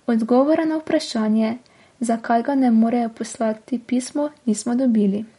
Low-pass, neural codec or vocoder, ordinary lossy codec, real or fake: 19.8 kHz; none; MP3, 48 kbps; real